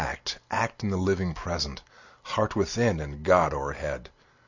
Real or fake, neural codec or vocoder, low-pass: real; none; 7.2 kHz